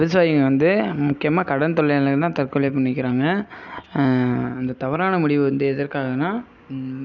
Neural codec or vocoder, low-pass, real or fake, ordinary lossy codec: none; 7.2 kHz; real; none